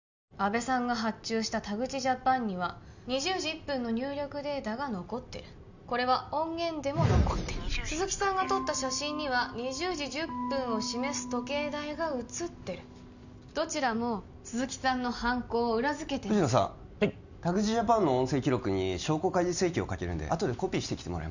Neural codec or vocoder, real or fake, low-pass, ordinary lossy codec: none; real; 7.2 kHz; none